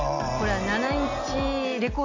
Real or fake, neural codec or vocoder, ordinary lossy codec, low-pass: real; none; AAC, 48 kbps; 7.2 kHz